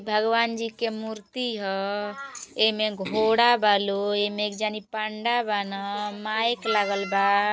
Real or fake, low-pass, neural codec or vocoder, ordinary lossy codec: real; none; none; none